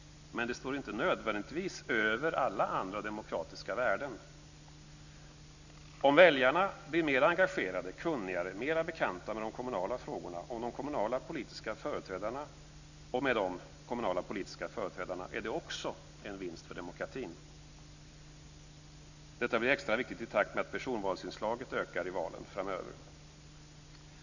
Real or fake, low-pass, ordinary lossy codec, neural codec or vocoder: real; 7.2 kHz; none; none